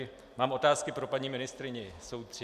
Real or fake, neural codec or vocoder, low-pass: real; none; 14.4 kHz